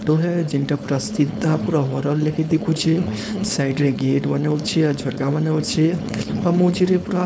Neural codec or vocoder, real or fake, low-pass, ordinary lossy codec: codec, 16 kHz, 4.8 kbps, FACodec; fake; none; none